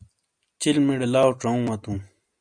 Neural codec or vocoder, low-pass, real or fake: none; 9.9 kHz; real